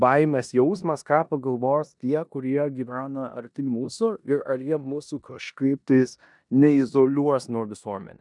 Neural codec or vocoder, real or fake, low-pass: codec, 16 kHz in and 24 kHz out, 0.9 kbps, LongCat-Audio-Codec, four codebook decoder; fake; 10.8 kHz